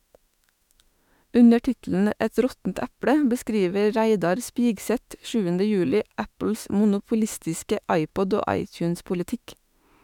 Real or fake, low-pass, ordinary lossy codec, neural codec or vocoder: fake; 19.8 kHz; none; autoencoder, 48 kHz, 32 numbers a frame, DAC-VAE, trained on Japanese speech